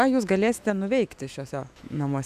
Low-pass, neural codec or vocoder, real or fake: 14.4 kHz; autoencoder, 48 kHz, 128 numbers a frame, DAC-VAE, trained on Japanese speech; fake